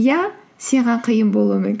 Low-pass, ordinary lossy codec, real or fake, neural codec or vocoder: none; none; real; none